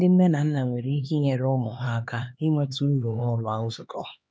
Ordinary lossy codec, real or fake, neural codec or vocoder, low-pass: none; fake; codec, 16 kHz, 2 kbps, X-Codec, HuBERT features, trained on LibriSpeech; none